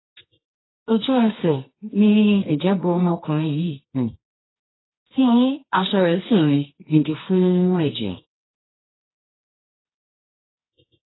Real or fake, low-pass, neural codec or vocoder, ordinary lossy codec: fake; 7.2 kHz; codec, 24 kHz, 0.9 kbps, WavTokenizer, medium music audio release; AAC, 16 kbps